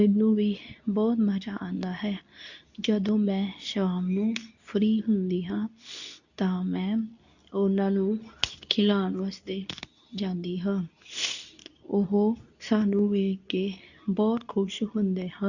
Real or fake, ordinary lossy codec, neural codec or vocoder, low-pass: fake; none; codec, 24 kHz, 0.9 kbps, WavTokenizer, medium speech release version 2; 7.2 kHz